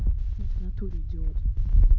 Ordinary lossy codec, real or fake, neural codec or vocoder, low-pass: none; real; none; 7.2 kHz